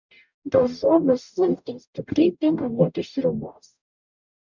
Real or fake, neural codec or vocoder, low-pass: fake; codec, 44.1 kHz, 0.9 kbps, DAC; 7.2 kHz